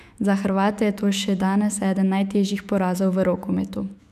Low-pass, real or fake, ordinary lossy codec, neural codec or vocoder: 14.4 kHz; fake; none; autoencoder, 48 kHz, 128 numbers a frame, DAC-VAE, trained on Japanese speech